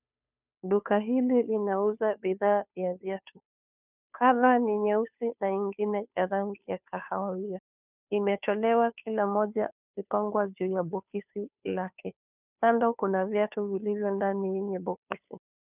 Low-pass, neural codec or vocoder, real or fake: 3.6 kHz; codec, 16 kHz, 2 kbps, FunCodec, trained on Chinese and English, 25 frames a second; fake